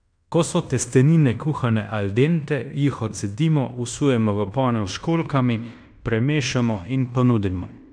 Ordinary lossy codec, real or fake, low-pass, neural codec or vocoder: none; fake; 9.9 kHz; codec, 16 kHz in and 24 kHz out, 0.9 kbps, LongCat-Audio-Codec, fine tuned four codebook decoder